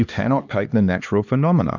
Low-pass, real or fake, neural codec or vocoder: 7.2 kHz; fake; codec, 16 kHz, 2 kbps, X-Codec, HuBERT features, trained on LibriSpeech